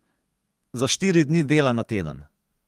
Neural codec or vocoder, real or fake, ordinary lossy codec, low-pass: codec, 32 kHz, 1.9 kbps, SNAC; fake; Opus, 32 kbps; 14.4 kHz